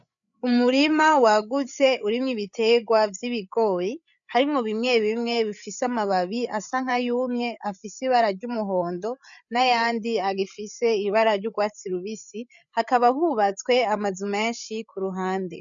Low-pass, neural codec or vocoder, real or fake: 7.2 kHz; codec, 16 kHz, 8 kbps, FreqCodec, larger model; fake